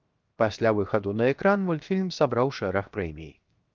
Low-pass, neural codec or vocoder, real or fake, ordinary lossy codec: 7.2 kHz; codec, 16 kHz, 0.7 kbps, FocalCodec; fake; Opus, 24 kbps